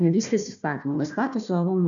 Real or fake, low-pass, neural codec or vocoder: fake; 7.2 kHz; codec, 16 kHz, 1 kbps, FunCodec, trained on Chinese and English, 50 frames a second